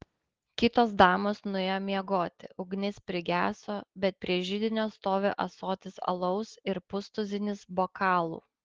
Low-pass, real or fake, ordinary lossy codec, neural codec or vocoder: 7.2 kHz; real; Opus, 16 kbps; none